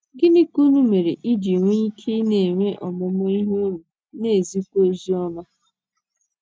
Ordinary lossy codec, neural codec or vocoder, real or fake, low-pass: none; none; real; none